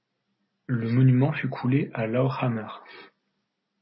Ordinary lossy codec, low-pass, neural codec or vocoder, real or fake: MP3, 24 kbps; 7.2 kHz; none; real